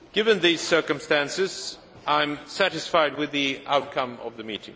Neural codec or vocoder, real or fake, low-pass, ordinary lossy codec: none; real; none; none